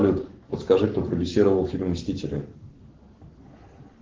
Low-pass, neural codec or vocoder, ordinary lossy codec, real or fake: 7.2 kHz; codec, 44.1 kHz, 7.8 kbps, Pupu-Codec; Opus, 16 kbps; fake